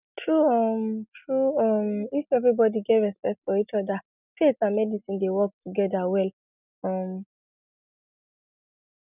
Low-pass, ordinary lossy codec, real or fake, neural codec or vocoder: 3.6 kHz; none; real; none